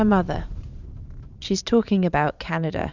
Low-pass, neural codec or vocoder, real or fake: 7.2 kHz; vocoder, 44.1 kHz, 128 mel bands every 512 samples, BigVGAN v2; fake